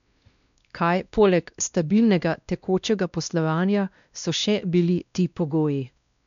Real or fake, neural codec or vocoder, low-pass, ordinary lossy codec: fake; codec, 16 kHz, 1 kbps, X-Codec, WavLM features, trained on Multilingual LibriSpeech; 7.2 kHz; none